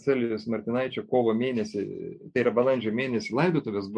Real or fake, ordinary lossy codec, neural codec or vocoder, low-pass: real; MP3, 48 kbps; none; 9.9 kHz